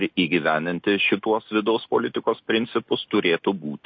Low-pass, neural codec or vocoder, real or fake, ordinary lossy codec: 7.2 kHz; none; real; MP3, 32 kbps